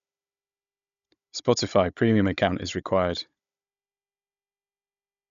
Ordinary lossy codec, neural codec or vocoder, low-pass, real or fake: none; codec, 16 kHz, 16 kbps, FunCodec, trained on Chinese and English, 50 frames a second; 7.2 kHz; fake